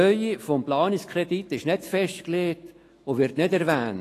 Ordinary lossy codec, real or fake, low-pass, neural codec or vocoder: AAC, 48 kbps; fake; 14.4 kHz; autoencoder, 48 kHz, 128 numbers a frame, DAC-VAE, trained on Japanese speech